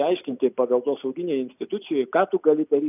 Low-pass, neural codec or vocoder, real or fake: 3.6 kHz; none; real